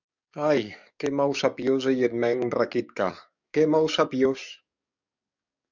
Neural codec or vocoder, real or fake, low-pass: codec, 44.1 kHz, 7.8 kbps, DAC; fake; 7.2 kHz